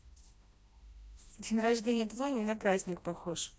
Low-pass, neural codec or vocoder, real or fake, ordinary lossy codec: none; codec, 16 kHz, 1 kbps, FreqCodec, smaller model; fake; none